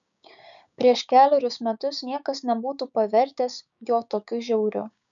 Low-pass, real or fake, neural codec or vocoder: 7.2 kHz; fake; codec, 16 kHz, 6 kbps, DAC